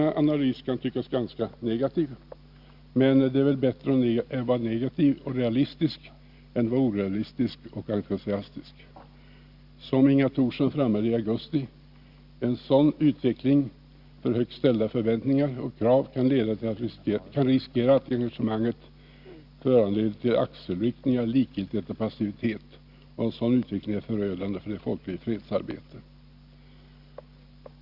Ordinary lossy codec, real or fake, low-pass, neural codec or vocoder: Opus, 64 kbps; real; 5.4 kHz; none